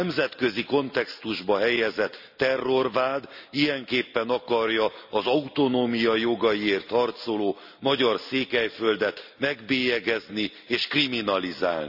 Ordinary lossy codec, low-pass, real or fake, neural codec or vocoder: none; 5.4 kHz; real; none